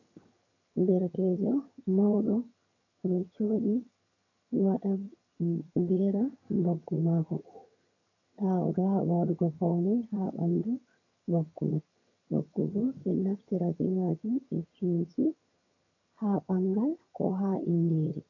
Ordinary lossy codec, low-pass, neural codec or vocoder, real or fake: AAC, 48 kbps; 7.2 kHz; vocoder, 22.05 kHz, 80 mel bands, HiFi-GAN; fake